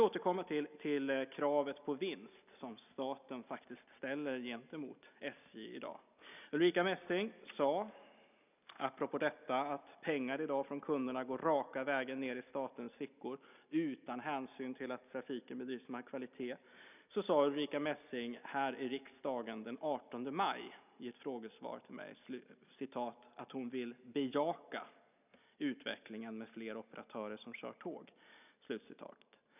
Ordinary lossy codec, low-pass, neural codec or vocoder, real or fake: none; 3.6 kHz; none; real